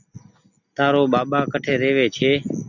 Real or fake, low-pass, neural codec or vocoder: real; 7.2 kHz; none